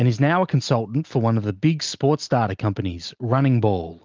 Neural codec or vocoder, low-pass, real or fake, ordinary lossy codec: none; 7.2 kHz; real; Opus, 32 kbps